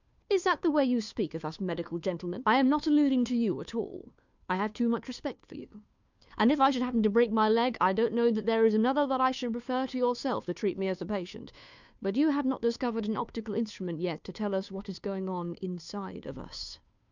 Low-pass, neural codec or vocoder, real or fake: 7.2 kHz; codec, 16 kHz, 2 kbps, FunCodec, trained on Chinese and English, 25 frames a second; fake